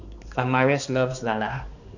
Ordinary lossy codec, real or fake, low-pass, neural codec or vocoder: none; fake; 7.2 kHz; codec, 16 kHz, 2 kbps, X-Codec, HuBERT features, trained on balanced general audio